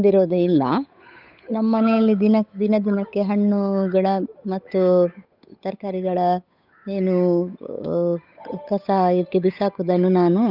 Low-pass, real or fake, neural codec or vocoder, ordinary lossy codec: 5.4 kHz; fake; codec, 16 kHz, 8 kbps, FunCodec, trained on Chinese and English, 25 frames a second; none